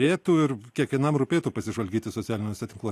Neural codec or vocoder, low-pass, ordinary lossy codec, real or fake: none; 14.4 kHz; AAC, 64 kbps; real